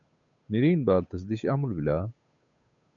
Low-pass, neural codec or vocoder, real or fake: 7.2 kHz; codec, 16 kHz, 8 kbps, FunCodec, trained on Chinese and English, 25 frames a second; fake